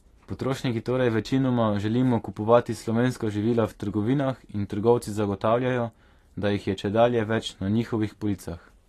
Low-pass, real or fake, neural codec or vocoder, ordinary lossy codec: 14.4 kHz; fake; vocoder, 44.1 kHz, 128 mel bands every 512 samples, BigVGAN v2; AAC, 48 kbps